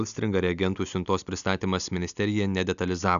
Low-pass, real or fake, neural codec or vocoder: 7.2 kHz; real; none